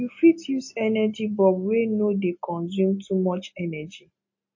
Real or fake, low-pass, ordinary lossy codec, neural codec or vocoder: real; 7.2 kHz; MP3, 32 kbps; none